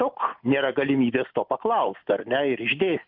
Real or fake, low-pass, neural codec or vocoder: real; 5.4 kHz; none